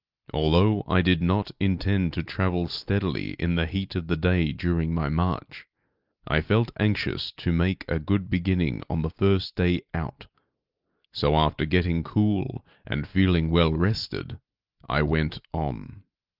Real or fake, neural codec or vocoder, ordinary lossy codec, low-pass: real; none; Opus, 32 kbps; 5.4 kHz